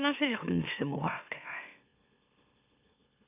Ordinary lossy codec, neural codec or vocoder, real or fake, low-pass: none; autoencoder, 44.1 kHz, a latent of 192 numbers a frame, MeloTTS; fake; 3.6 kHz